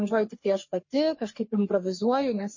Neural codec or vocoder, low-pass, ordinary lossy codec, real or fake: codec, 44.1 kHz, 3.4 kbps, Pupu-Codec; 7.2 kHz; MP3, 32 kbps; fake